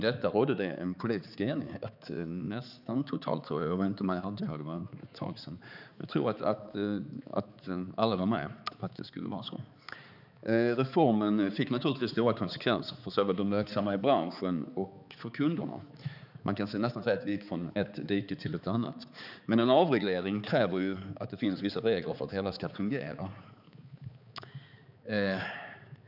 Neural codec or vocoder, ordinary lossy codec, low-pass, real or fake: codec, 16 kHz, 4 kbps, X-Codec, HuBERT features, trained on balanced general audio; none; 5.4 kHz; fake